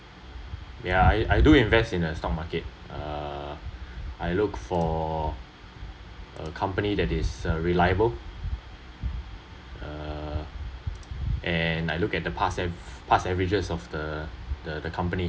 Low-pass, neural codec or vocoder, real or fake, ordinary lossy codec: none; none; real; none